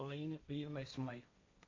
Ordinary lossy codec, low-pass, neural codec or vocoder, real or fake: MP3, 48 kbps; 7.2 kHz; codec, 16 kHz, 1.1 kbps, Voila-Tokenizer; fake